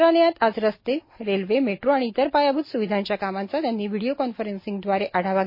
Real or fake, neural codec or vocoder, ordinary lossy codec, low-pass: real; none; MP3, 24 kbps; 5.4 kHz